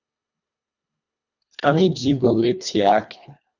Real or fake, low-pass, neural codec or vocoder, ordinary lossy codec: fake; 7.2 kHz; codec, 24 kHz, 1.5 kbps, HILCodec; none